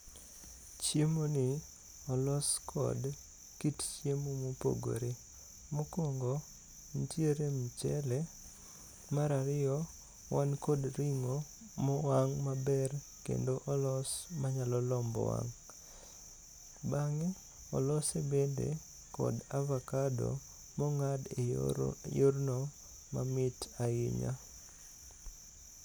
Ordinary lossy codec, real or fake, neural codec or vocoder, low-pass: none; real; none; none